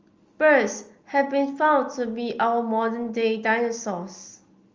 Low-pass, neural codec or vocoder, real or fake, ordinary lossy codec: 7.2 kHz; none; real; Opus, 32 kbps